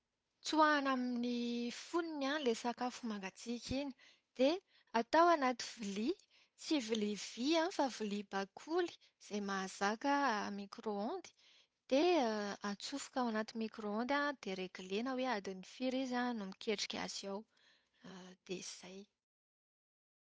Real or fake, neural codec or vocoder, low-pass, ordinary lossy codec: fake; codec, 16 kHz, 8 kbps, FunCodec, trained on Chinese and English, 25 frames a second; none; none